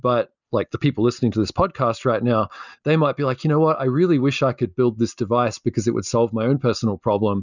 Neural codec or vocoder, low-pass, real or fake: none; 7.2 kHz; real